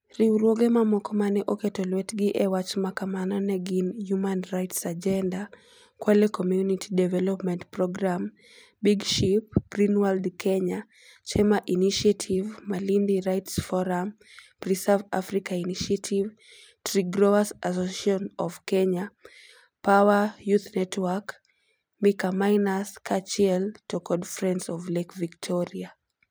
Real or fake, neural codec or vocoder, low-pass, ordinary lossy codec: fake; vocoder, 44.1 kHz, 128 mel bands every 256 samples, BigVGAN v2; none; none